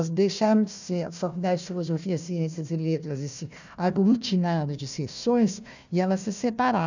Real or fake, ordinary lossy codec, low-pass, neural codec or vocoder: fake; none; 7.2 kHz; codec, 16 kHz, 1 kbps, FunCodec, trained on Chinese and English, 50 frames a second